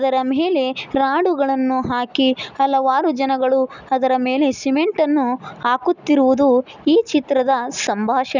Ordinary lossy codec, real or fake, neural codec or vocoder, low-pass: none; real; none; 7.2 kHz